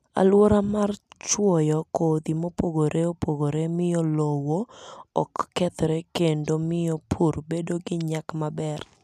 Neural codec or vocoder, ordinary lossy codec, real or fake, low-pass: none; none; real; 14.4 kHz